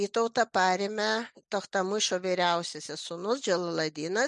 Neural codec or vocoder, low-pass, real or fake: none; 10.8 kHz; real